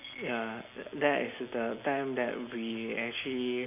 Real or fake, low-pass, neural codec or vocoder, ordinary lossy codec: real; 3.6 kHz; none; none